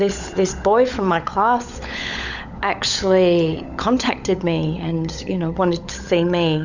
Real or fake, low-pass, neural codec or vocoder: fake; 7.2 kHz; codec, 16 kHz, 16 kbps, FunCodec, trained on LibriTTS, 50 frames a second